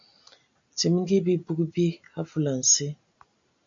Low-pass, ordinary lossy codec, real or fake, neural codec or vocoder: 7.2 kHz; AAC, 64 kbps; real; none